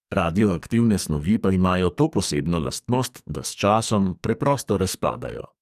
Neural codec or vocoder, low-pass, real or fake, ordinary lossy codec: codec, 44.1 kHz, 2.6 kbps, SNAC; 14.4 kHz; fake; none